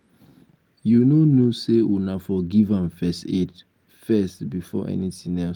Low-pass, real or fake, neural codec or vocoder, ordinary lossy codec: 19.8 kHz; real; none; Opus, 32 kbps